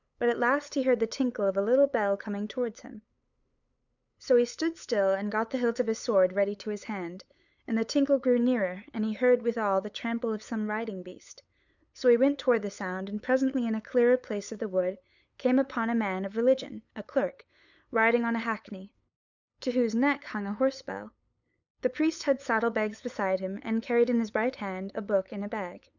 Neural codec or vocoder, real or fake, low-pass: codec, 16 kHz, 8 kbps, FunCodec, trained on LibriTTS, 25 frames a second; fake; 7.2 kHz